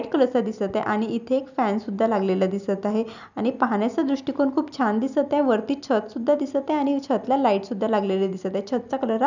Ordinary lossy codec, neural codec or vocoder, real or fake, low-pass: none; none; real; 7.2 kHz